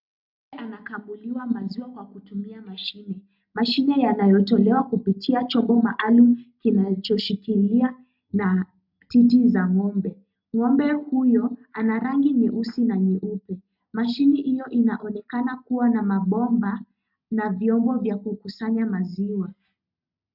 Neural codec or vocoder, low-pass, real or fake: none; 5.4 kHz; real